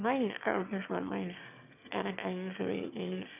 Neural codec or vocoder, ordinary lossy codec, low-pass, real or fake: autoencoder, 22.05 kHz, a latent of 192 numbers a frame, VITS, trained on one speaker; none; 3.6 kHz; fake